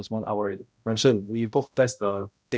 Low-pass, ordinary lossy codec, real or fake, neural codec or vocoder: none; none; fake; codec, 16 kHz, 0.5 kbps, X-Codec, HuBERT features, trained on balanced general audio